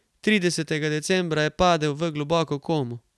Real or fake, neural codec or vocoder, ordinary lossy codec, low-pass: real; none; none; none